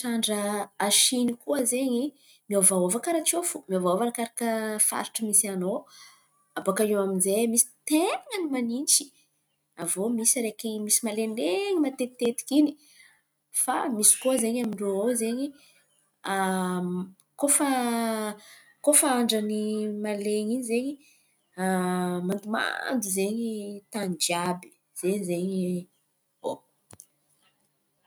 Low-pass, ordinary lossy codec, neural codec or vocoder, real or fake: none; none; none; real